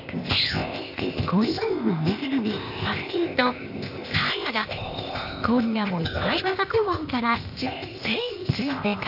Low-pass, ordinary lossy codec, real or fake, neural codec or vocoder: 5.4 kHz; none; fake; codec, 16 kHz, 0.8 kbps, ZipCodec